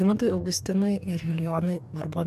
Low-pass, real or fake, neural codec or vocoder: 14.4 kHz; fake; codec, 44.1 kHz, 2.6 kbps, DAC